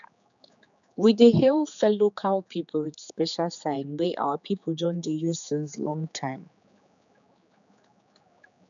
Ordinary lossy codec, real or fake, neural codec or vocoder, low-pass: none; fake; codec, 16 kHz, 4 kbps, X-Codec, HuBERT features, trained on general audio; 7.2 kHz